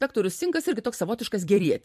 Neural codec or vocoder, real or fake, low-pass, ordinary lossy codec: vocoder, 44.1 kHz, 128 mel bands every 256 samples, BigVGAN v2; fake; 14.4 kHz; MP3, 64 kbps